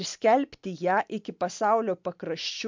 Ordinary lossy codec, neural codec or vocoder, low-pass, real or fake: MP3, 64 kbps; none; 7.2 kHz; real